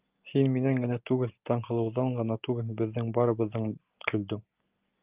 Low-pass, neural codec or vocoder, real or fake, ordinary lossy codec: 3.6 kHz; none; real; Opus, 24 kbps